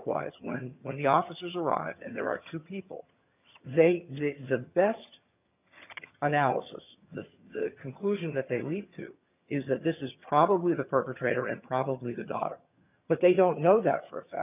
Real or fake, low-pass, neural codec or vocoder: fake; 3.6 kHz; vocoder, 22.05 kHz, 80 mel bands, HiFi-GAN